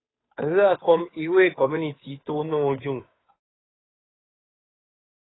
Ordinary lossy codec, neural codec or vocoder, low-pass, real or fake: AAC, 16 kbps; codec, 16 kHz, 2 kbps, FunCodec, trained on Chinese and English, 25 frames a second; 7.2 kHz; fake